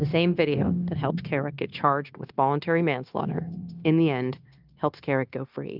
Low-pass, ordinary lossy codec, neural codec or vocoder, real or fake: 5.4 kHz; Opus, 24 kbps; codec, 16 kHz, 0.9 kbps, LongCat-Audio-Codec; fake